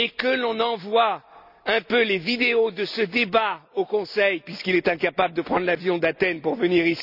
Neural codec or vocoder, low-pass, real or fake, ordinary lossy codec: none; 5.4 kHz; real; none